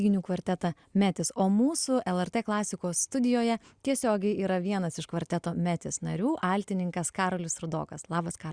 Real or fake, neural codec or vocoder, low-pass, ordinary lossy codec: real; none; 9.9 kHz; Opus, 64 kbps